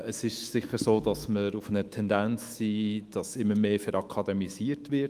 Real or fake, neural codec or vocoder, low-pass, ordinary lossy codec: fake; autoencoder, 48 kHz, 128 numbers a frame, DAC-VAE, trained on Japanese speech; 14.4 kHz; Opus, 32 kbps